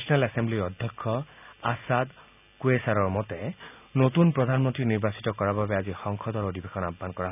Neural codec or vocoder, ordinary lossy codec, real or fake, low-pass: none; none; real; 3.6 kHz